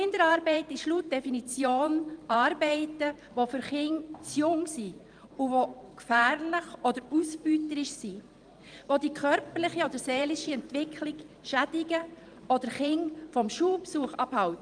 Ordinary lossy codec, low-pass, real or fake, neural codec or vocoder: Opus, 32 kbps; 9.9 kHz; fake; vocoder, 44.1 kHz, 128 mel bands every 512 samples, BigVGAN v2